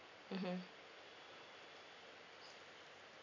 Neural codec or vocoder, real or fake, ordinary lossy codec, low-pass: none; real; MP3, 64 kbps; 7.2 kHz